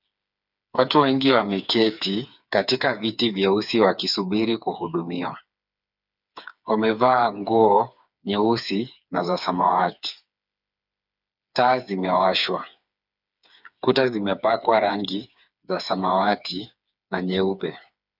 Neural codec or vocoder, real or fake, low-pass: codec, 16 kHz, 4 kbps, FreqCodec, smaller model; fake; 5.4 kHz